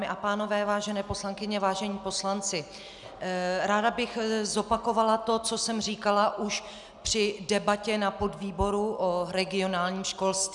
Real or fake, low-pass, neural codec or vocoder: real; 10.8 kHz; none